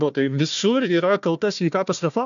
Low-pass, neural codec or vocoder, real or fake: 7.2 kHz; codec, 16 kHz, 1 kbps, FunCodec, trained on Chinese and English, 50 frames a second; fake